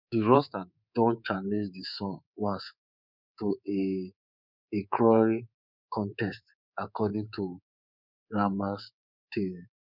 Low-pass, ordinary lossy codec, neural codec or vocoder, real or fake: 5.4 kHz; none; codec, 24 kHz, 3.1 kbps, DualCodec; fake